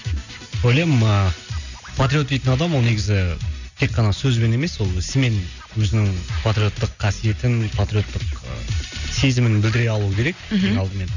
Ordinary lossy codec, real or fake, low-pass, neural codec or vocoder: none; real; 7.2 kHz; none